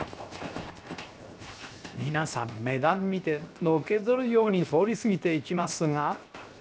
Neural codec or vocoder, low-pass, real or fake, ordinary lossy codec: codec, 16 kHz, 0.7 kbps, FocalCodec; none; fake; none